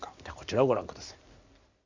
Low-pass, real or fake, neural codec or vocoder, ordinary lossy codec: 7.2 kHz; real; none; Opus, 64 kbps